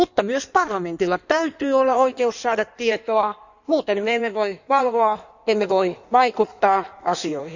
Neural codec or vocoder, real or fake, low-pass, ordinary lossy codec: codec, 16 kHz in and 24 kHz out, 1.1 kbps, FireRedTTS-2 codec; fake; 7.2 kHz; none